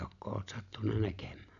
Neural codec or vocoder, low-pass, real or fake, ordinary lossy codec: none; 7.2 kHz; real; MP3, 96 kbps